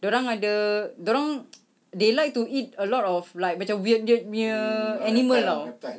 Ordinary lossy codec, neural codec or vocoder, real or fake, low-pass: none; none; real; none